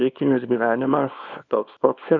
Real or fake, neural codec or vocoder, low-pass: fake; codec, 24 kHz, 0.9 kbps, WavTokenizer, small release; 7.2 kHz